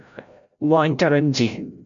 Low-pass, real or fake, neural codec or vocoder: 7.2 kHz; fake; codec, 16 kHz, 0.5 kbps, FreqCodec, larger model